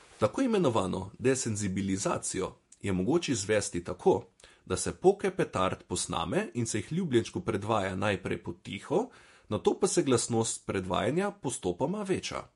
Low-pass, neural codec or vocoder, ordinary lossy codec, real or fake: 14.4 kHz; vocoder, 48 kHz, 128 mel bands, Vocos; MP3, 48 kbps; fake